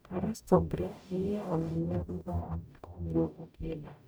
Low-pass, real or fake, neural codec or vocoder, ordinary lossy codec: none; fake; codec, 44.1 kHz, 0.9 kbps, DAC; none